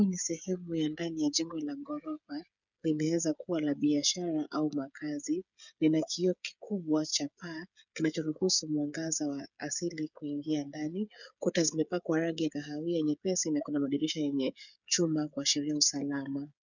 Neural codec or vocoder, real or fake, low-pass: codec, 16 kHz, 8 kbps, FreqCodec, smaller model; fake; 7.2 kHz